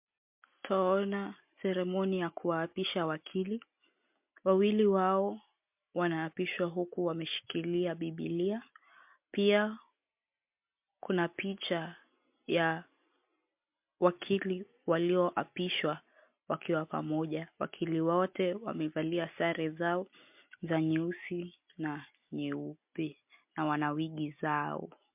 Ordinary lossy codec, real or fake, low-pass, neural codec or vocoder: MP3, 32 kbps; real; 3.6 kHz; none